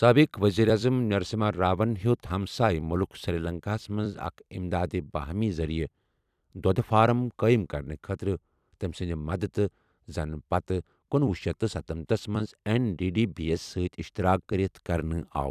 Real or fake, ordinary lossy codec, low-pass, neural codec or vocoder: fake; none; 14.4 kHz; vocoder, 44.1 kHz, 128 mel bands every 256 samples, BigVGAN v2